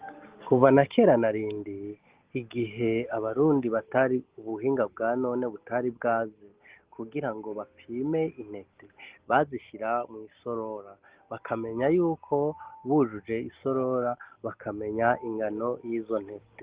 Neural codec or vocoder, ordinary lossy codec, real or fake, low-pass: none; Opus, 16 kbps; real; 3.6 kHz